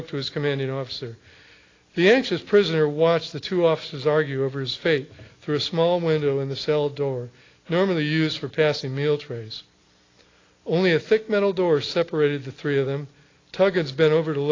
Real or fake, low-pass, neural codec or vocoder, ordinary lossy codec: real; 7.2 kHz; none; AAC, 32 kbps